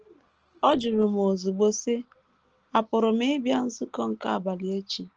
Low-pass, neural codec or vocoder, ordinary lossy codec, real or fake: 7.2 kHz; none; Opus, 16 kbps; real